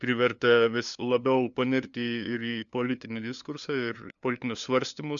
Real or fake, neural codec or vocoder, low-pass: fake; codec, 16 kHz, 2 kbps, FunCodec, trained on LibriTTS, 25 frames a second; 7.2 kHz